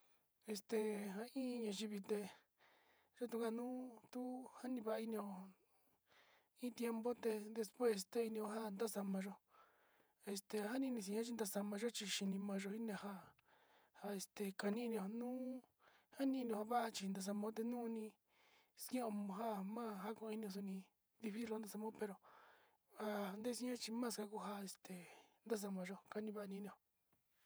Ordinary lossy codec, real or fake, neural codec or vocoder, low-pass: none; fake; vocoder, 48 kHz, 128 mel bands, Vocos; none